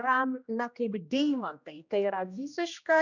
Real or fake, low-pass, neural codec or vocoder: fake; 7.2 kHz; codec, 16 kHz, 1 kbps, X-Codec, HuBERT features, trained on general audio